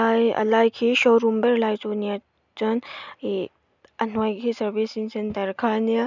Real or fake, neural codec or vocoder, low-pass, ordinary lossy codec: real; none; 7.2 kHz; none